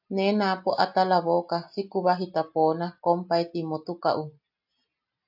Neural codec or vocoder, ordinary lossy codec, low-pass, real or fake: none; AAC, 48 kbps; 5.4 kHz; real